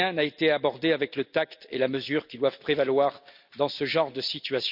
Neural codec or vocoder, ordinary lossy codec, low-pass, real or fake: none; none; 5.4 kHz; real